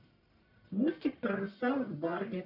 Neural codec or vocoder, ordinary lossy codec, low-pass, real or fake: codec, 44.1 kHz, 1.7 kbps, Pupu-Codec; none; 5.4 kHz; fake